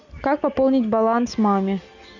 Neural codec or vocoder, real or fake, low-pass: none; real; 7.2 kHz